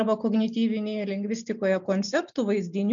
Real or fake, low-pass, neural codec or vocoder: real; 7.2 kHz; none